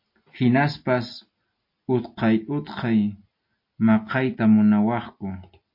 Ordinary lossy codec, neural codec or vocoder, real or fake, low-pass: MP3, 32 kbps; none; real; 5.4 kHz